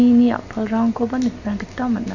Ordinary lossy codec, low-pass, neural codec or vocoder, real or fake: none; 7.2 kHz; none; real